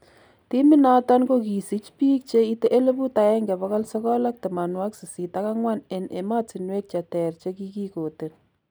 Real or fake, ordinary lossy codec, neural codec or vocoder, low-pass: real; none; none; none